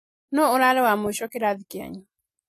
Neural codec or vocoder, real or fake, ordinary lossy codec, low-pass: none; real; AAC, 48 kbps; 14.4 kHz